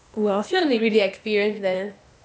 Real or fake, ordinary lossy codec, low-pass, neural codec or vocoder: fake; none; none; codec, 16 kHz, 0.8 kbps, ZipCodec